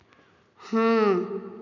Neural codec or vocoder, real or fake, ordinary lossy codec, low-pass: none; real; MP3, 64 kbps; 7.2 kHz